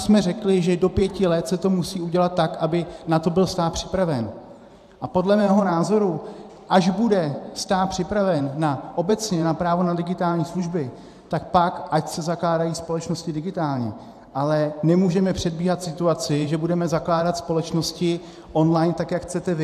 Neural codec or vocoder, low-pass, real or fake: vocoder, 44.1 kHz, 128 mel bands every 512 samples, BigVGAN v2; 14.4 kHz; fake